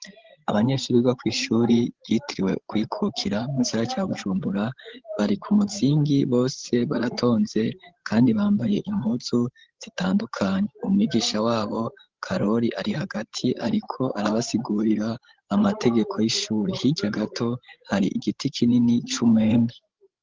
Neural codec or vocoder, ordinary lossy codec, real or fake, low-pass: codec, 16 kHz, 16 kbps, FreqCodec, larger model; Opus, 16 kbps; fake; 7.2 kHz